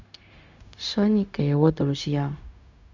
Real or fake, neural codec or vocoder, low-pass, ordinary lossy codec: fake; codec, 16 kHz, 0.4 kbps, LongCat-Audio-Codec; 7.2 kHz; none